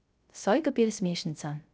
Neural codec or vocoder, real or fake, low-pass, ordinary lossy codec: codec, 16 kHz, 0.3 kbps, FocalCodec; fake; none; none